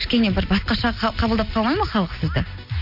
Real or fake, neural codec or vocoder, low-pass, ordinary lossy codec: real; none; 5.4 kHz; none